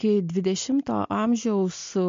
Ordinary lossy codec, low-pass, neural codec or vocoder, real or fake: MP3, 48 kbps; 7.2 kHz; none; real